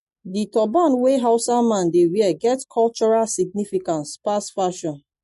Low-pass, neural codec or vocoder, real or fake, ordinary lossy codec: 14.4 kHz; none; real; MP3, 48 kbps